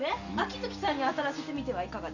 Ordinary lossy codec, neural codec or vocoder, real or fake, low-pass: none; none; real; 7.2 kHz